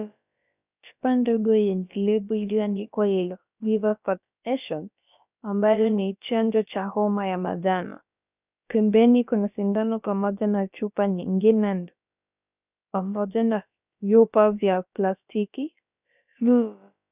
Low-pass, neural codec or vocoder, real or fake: 3.6 kHz; codec, 16 kHz, about 1 kbps, DyCAST, with the encoder's durations; fake